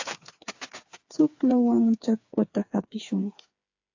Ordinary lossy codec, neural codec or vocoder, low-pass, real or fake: AAC, 48 kbps; codec, 16 kHz, 4 kbps, FreqCodec, smaller model; 7.2 kHz; fake